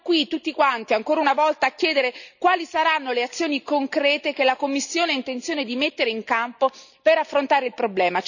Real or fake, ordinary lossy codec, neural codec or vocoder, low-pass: real; none; none; 7.2 kHz